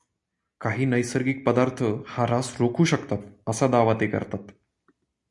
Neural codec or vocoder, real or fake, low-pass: none; real; 10.8 kHz